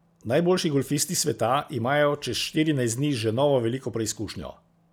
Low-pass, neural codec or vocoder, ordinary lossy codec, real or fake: none; none; none; real